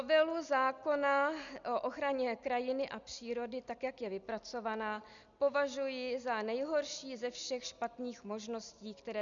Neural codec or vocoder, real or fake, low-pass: none; real; 7.2 kHz